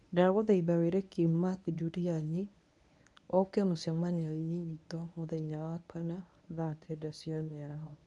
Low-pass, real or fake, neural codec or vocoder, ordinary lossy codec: 10.8 kHz; fake; codec, 24 kHz, 0.9 kbps, WavTokenizer, medium speech release version 1; none